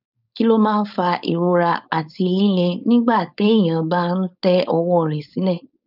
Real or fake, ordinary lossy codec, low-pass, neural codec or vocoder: fake; none; 5.4 kHz; codec, 16 kHz, 4.8 kbps, FACodec